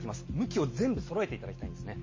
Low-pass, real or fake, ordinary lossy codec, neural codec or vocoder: 7.2 kHz; real; MP3, 32 kbps; none